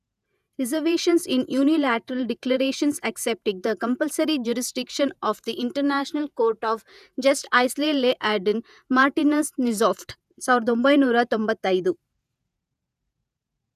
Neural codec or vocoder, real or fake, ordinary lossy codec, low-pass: vocoder, 48 kHz, 128 mel bands, Vocos; fake; none; 14.4 kHz